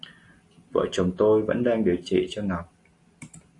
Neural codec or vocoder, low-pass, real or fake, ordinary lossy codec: none; 10.8 kHz; real; AAC, 48 kbps